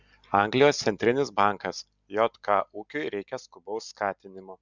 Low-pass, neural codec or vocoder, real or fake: 7.2 kHz; none; real